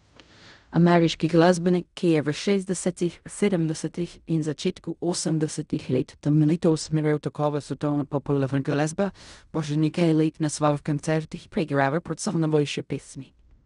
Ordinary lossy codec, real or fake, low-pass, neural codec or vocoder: none; fake; 10.8 kHz; codec, 16 kHz in and 24 kHz out, 0.4 kbps, LongCat-Audio-Codec, fine tuned four codebook decoder